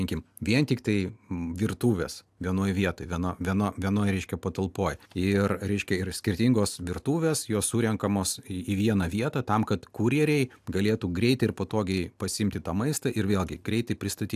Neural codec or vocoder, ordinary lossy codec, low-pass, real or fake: none; AAC, 96 kbps; 14.4 kHz; real